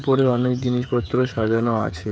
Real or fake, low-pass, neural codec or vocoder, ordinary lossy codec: fake; none; codec, 16 kHz, 16 kbps, FreqCodec, smaller model; none